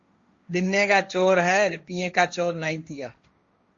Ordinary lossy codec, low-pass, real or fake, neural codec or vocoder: Opus, 64 kbps; 7.2 kHz; fake; codec, 16 kHz, 1.1 kbps, Voila-Tokenizer